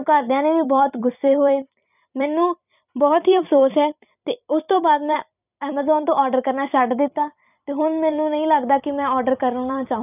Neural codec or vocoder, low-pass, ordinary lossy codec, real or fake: vocoder, 44.1 kHz, 128 mel bands every 256 samples, BigVGAN v2; 3.6 kHz; none; fake